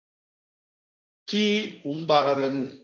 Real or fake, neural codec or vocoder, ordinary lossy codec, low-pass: fake; codec, 16 kHz, 1.1 kbps, Voila-Tokenizer; AAC, 48 kbps; 7.2 kHz